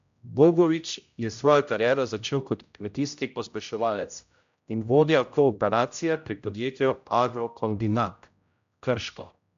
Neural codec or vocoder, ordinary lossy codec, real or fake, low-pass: codec, 16 kHz, 0.5 kbps, X-Codec, HuBERT features, trained on general audio; MP3, 64 kbps; fake; 7.2 kHz